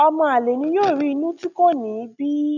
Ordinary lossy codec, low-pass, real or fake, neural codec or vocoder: none; 7.2 kHz; real; none